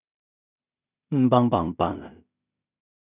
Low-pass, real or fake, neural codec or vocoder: 3.6 kHz; fake; codec, 16 kHz in and 24 kHz out, 0.4 kbps, LongCat-Audio-Codec, two codebook decoder